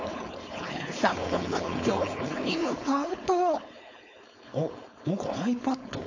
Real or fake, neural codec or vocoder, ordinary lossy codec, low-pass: fake; codec, 16 kHz, 4.8 kbps, FACodec; AAC, 48 kbps; 7.2 kHz